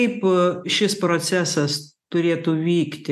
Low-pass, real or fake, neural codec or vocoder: 14.4 kHz; real; none